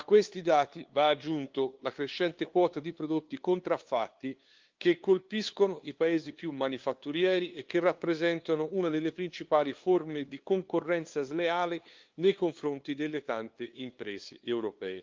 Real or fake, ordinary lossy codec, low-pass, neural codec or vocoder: fake; Opus, 32 kbps; 7.2 kHz; codec, 16 kHz, 2 kbps, FunCodec, trained on LibriTTS, 25 frames a second